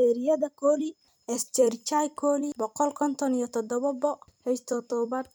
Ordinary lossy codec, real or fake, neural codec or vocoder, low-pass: none; fake; vocoder, 44.1 kHz, 128 mel bands every 256 samples, BigVGAN v2; none